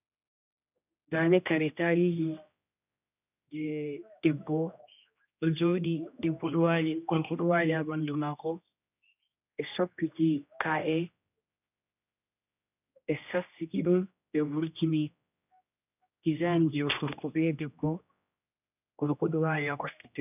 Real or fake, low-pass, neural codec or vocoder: fake; 3.6 kHz; codec, 16 kHz, 1 kbps, X-Codec, HuBERT features, trained on general audio